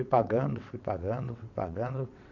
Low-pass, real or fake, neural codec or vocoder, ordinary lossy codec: 7.2 kHz; fake; vocoder, 22.05 kHz, 80 mel bands, WaveNeXt; none